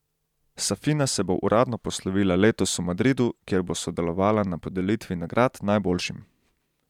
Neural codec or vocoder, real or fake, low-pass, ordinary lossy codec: vocoder, 44.1 kHz, 128 mel bands every 512 samples, BigVGAN v2; fake; 19.8 kHz; none